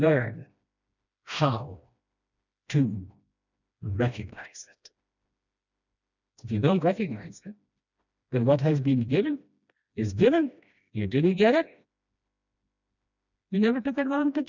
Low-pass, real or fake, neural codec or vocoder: 7.2 kHz; fake; codec, 16 kHz, 1 kbps, FreqCodec, smaller model